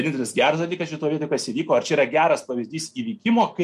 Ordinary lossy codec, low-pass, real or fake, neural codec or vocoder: MP3, 64 kbps; 14.4 kHz; real; none